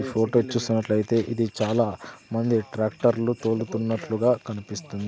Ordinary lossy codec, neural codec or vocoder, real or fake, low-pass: none; none; real; none